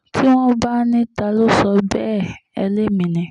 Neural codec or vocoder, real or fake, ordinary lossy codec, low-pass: none; real; none; 10.8 kHz